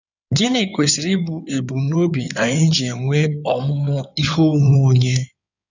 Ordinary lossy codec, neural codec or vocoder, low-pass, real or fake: none; codec, 16 kHz in and 24 kHz out, 2.2 kbps, FireRedTTS-2 codec; 7.2 kHz; fake